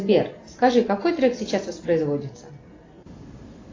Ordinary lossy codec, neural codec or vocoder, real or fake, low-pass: AAC, 32 kbps; none; real; 7.2 kHz